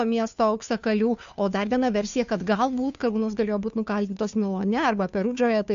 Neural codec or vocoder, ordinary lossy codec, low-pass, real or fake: codec, 16 kHz, 2 kbps, FunCodec, trained on Chinese and English, 25 frames a second; AAC, 96 kbps; 7.2 kHz; fake